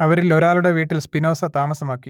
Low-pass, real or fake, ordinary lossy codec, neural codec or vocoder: 19.8 kHz; fake; none; codec, 44.1 kHz, 7.8 kbps, DAC